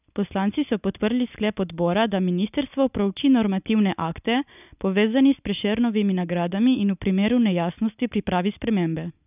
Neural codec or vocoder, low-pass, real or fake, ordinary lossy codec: none; 3.6 kHz; real; none